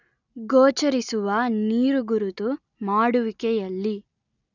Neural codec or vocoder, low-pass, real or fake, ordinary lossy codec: none; 7.2 kHz; real; none